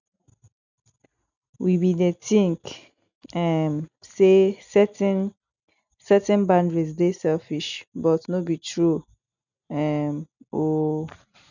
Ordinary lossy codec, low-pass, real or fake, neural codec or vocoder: none; 7.2 kHz; real; none